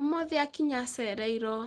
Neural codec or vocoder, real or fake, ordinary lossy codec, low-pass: none; real; Opus, 16 kbps; 9.9 kHz